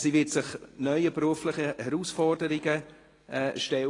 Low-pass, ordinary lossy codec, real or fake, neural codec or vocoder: 10.8 kHz; AAC, 32 kbps; real; none